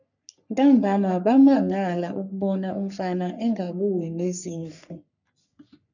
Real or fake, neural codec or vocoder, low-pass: fake; codec, 44.1 kHz, 3.4 kbps, Pupu-Codec; 7.2 kHz